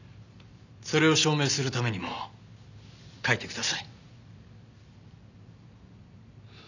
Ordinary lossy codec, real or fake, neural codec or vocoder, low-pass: none; real; none; 7.2 kHz